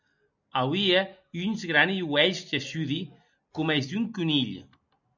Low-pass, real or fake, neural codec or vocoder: 7.2 kHz; real; none